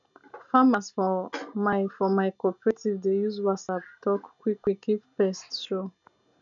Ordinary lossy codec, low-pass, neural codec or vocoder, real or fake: none; 7.2 kHz; none; real